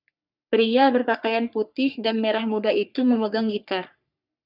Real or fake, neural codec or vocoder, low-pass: fake; codec, 44.1 kHz, 3.4 kbps, Pupu-Codec; 5.4 kHz